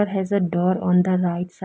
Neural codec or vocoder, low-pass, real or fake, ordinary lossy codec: none; none; real; none